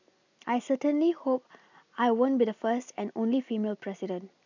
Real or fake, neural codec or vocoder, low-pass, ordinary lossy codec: real; none; 7.2 kHz; none